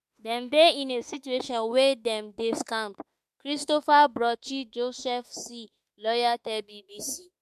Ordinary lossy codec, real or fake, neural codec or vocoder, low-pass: MP3, 96 kbps; fake; autoencoder, 48 kHz, 32 numbers a frame, DAC-VAE, trained on Japanese speech; 14.4 kHz